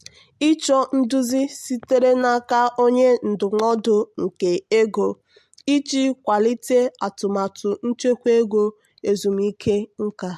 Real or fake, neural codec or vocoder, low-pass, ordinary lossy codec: real; none; 14.4 kHz; MP3, 64 kbps